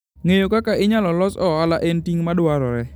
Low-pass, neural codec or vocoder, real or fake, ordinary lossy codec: none; none; real; none